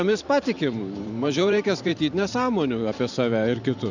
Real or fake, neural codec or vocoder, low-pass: fake; vocoder, 44.1 kHz, 128 mel bands every 512 samples, BigVGAN v2; 7.2 kHz